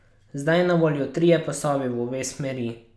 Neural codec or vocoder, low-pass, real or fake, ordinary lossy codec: none; none; real; none